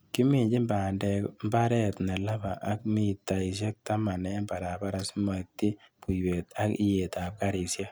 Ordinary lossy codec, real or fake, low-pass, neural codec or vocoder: none; real; none; none